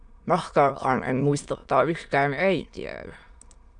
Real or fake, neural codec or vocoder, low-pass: fake; autoencoder, 22.05 kHz, a latent of 192 numbers a frame, VITS, trained on many speakers; 9.9 kHz